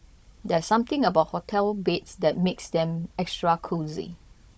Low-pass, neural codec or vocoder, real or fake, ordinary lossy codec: none; codec, 16 kHz, 16 kbps, FunCodec, trained on Chinese and English, 50 frames a second; fake; none